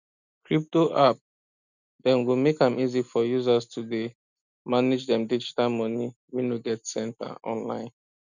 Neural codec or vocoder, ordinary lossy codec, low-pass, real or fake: none; none; 7.2 kHz; real